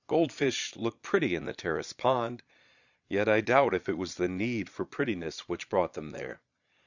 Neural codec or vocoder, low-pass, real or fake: vocoder, 22.05 kHz, 80 mel bands, Vocos; 7.2 kHz; fake